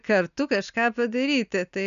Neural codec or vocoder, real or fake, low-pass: none; real; 7.2 kHz